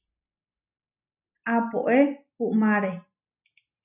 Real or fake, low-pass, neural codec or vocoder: real; 3.6 kHz; none